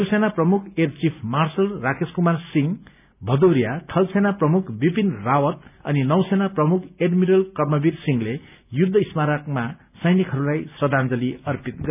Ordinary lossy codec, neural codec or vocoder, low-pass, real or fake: none; none; 3.6 kHz; real